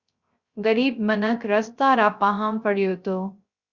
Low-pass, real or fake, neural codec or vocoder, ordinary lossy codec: 7.2 kHz; fake; codec, 16 kHz, 0.3 kbps, FocalCodec; Opus, 64 kbps